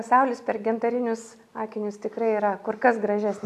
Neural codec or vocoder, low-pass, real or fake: none; 14.4 kHz; real